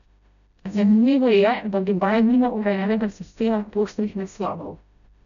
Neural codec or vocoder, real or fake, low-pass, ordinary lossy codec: codec, 16 kHz, 0.5 kbps, FreqCodec, smaller model; fake; 7.2 kHz; none